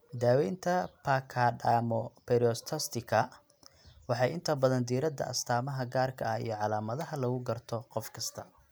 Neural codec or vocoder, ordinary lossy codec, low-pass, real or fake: none; none; none; real